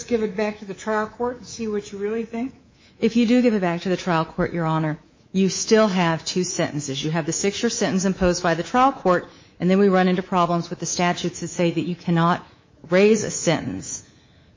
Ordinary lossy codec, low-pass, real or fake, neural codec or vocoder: MP3, 32 kbps; 7.2 kHz; fake; codec, 24 kHz, 3.1 kbps, DualCodec